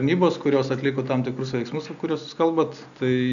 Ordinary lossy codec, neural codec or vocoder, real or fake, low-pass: MP3, 96 kbps; none; real; 7.2 kHz